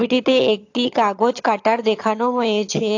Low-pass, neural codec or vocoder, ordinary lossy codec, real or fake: 7.2 kHz; vocoder, 22.05 kHz, 80 mel bands, HiFi-GAN; AAC, 48 kbps; fake